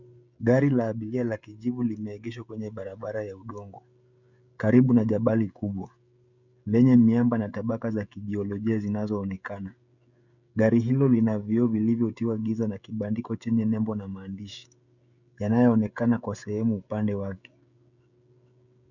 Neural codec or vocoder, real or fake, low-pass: codec, 16 kHz, 16 kbps, FreqCodec, smaller model; fake; 7.2 kHz